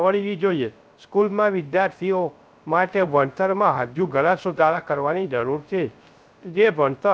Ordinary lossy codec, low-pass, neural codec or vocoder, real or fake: none; none; codec, 16 kHz, 0.3 kbps, FocalCodec; fake